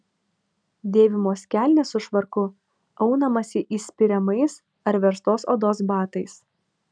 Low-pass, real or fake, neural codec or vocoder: 9.9 kHz; real; none